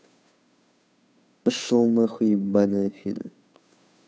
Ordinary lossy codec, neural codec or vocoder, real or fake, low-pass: none; codec, 16 kHz, 2 kbps, FunCodec, trained on Chinese and English, 25 frames a second; fake; none